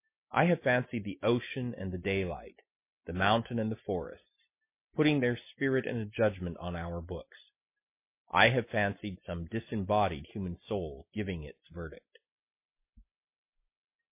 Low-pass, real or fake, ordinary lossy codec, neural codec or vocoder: 3.6 kHz; real; MP3, 24 kbps; none